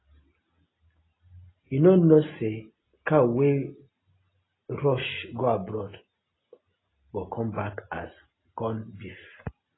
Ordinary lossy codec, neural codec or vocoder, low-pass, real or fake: AAC, 16 kbps; none; 7.2 kHz; real